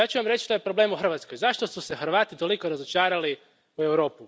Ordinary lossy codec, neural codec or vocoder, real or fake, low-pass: none; none; real; none